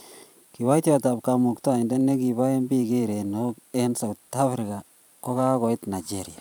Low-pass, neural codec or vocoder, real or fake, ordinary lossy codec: none; vocoder, 44.1 kHz, 128 mel bands every 512 samples, BigVGAN v2; fake; none